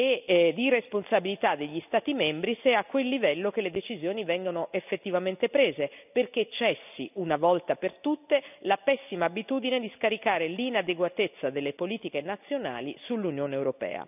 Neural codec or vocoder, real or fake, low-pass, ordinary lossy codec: none; real; 3.6 kHz; none